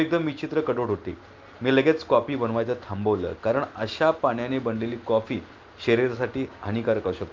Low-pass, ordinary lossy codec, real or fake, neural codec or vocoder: 7.2 kHz; Opus, 24 kbps; real; none